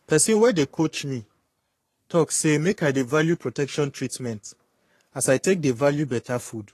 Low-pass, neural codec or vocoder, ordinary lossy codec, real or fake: 14.4 kHz; codec, 44.1 kHz, 3.4 kbps, Pupu-Codec; AAC, 48 kbps; fake